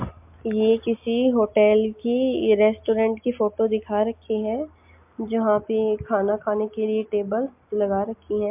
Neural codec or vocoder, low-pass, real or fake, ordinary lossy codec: none; 3.6 kHz; real; MP3, 32 kbps